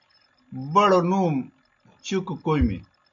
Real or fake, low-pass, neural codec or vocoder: real; 7.2 kHz; none